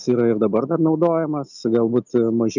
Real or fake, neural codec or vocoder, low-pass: real; none; 7.2 kHz